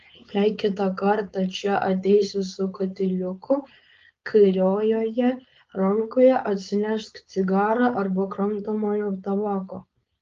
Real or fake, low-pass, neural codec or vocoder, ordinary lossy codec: fake; 7.2 kHz; codec, 16 kHz, 4.8 kbps, FACodec; Opus, 32 kbps